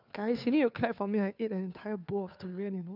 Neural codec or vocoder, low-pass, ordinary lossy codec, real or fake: codec, 16 kHz, 2 kbps, FunCodec, trained on Chinese and English, 25 frames a second; 5.4 kHz; none; fake